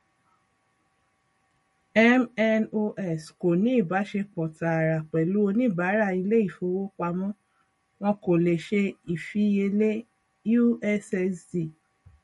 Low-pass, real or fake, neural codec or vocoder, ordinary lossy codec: 19.8 kHz; real; none; MP3, 48 kbps